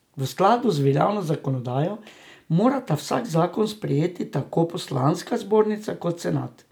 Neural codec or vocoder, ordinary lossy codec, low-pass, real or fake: none; none; none; real